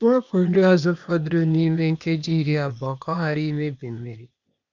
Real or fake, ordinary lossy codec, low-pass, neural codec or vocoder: fake; none; 7.2 kHz; codec, 16 kHz, 0.8 kbps, ZipCodec